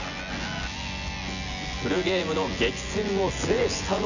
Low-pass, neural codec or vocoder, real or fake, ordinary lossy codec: 7.2 kHz; vocoder, 24 kHz, 100 mel bands, Vocos; fake; none